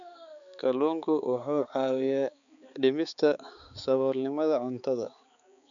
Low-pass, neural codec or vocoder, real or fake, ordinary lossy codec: 7.2 kHz; codec, 16 kHz, 4 kbps, X-Codec, HuBERT features, trained on balanced general audio; fake; none